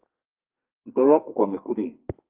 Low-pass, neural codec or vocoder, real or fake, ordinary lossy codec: 3.6 kHz; codec, 32 kHz, 1.9 kbps, SNAC; fake; Opus, 32 kbps